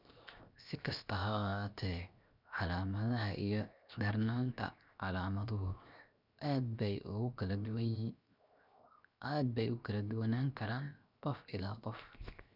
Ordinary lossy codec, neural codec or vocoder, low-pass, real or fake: none; codec, 16 kHz, 0.7 kbps, FocalCodec; 5.4 kHz; fake